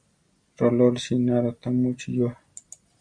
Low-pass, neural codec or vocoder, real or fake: 9.9 kHz; none; real